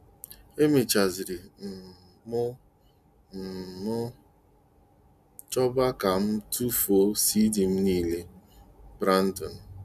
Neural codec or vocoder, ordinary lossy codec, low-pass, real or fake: none; none; 14.4 kHz; real